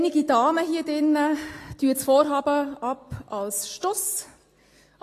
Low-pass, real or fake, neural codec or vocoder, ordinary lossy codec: 14.4 kHz; real; none; AAC, 48 kbps